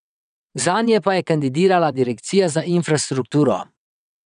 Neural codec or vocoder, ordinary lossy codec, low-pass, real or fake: vocoder, 22.05 kHz, 80 mel bands, WaveNeXt; none; 9.9 kHz; fake